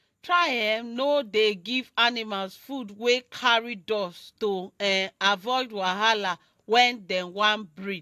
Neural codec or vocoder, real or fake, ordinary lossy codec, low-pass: none; real; AAC, 64 kbps; 14.4 kHz